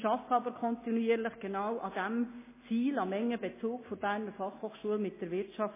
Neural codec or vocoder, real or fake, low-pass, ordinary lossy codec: none; real; 3.6 kHz; MP3, 16 kbps